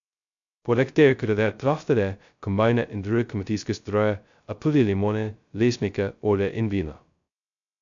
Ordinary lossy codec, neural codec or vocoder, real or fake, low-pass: MP3, 64 kbps; codec, 16 kHz, 0.2 kbps, FocalCodec; fake; 7.2 kHz